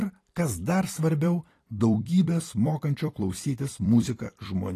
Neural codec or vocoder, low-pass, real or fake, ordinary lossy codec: vocoder, 44.1 kHz, 128 mel bands every 256 samples, BigVGAN v2; 14.4 kHz; fake; AAC, 48 kbps